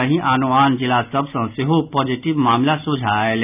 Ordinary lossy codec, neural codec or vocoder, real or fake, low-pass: none; none; real; 3.6 kHz